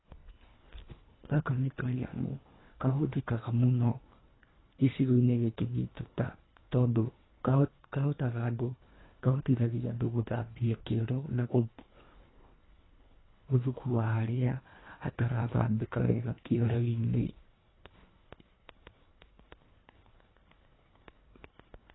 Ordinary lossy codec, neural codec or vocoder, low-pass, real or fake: AAC, 16 kbps; codec, 24 kHz, 1.5 kbps, HILCodec; 7.2 kHz; fake